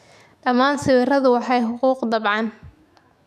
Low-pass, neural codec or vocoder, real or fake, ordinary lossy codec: 14.4 kHz; autoencoder, 48 kHz, 128 numbers a frame, DAC-VAE, trained on Japanese speech; fake; none